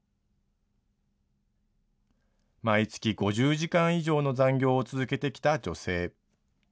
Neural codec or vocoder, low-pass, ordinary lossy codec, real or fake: none; none; none; real